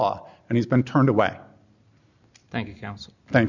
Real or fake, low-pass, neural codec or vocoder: real; 7.2 kHz; none